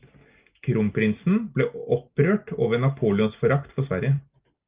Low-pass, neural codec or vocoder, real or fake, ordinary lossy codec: 3.6 kHz; none; real; Opus, 24 kbps